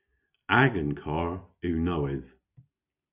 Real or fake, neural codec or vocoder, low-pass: real; none; 3.6 kHz